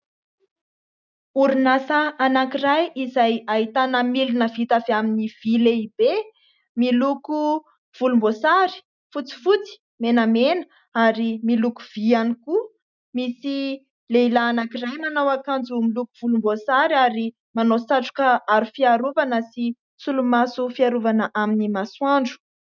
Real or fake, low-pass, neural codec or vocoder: real; 7.2 kHz; none